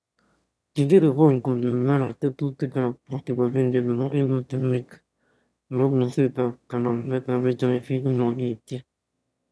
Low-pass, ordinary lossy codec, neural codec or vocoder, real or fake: none; none; autoencoder, 22.05 kHz, a latent of 192 numbers a frame, VITS, trained on one speaker; fake